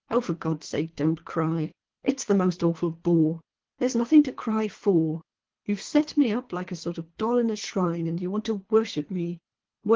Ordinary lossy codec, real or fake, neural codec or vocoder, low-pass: Opus, 16 kbps; fake; codec, 24 kHz, 3 kbps, HILCodec; 7.2 kHz